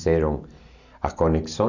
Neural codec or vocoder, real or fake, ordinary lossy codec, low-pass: none; real; none; 7.2 kHz